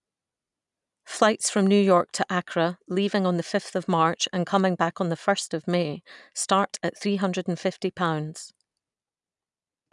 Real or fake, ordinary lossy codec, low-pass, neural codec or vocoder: real; none; 10.8 kHz; none